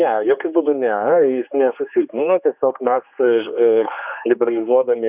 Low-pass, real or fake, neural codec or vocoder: 3.6 kHz; fake; codec, 16 kHz, 2 kbps, X-Codec, HuBERT features, trained on general audio